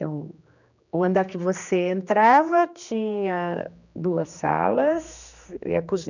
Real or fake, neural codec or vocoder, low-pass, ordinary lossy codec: fake; codec, 16 kHz, 2 kbps, X-Codec, HuBERT features, trained on general audio; 7.2 kHz; none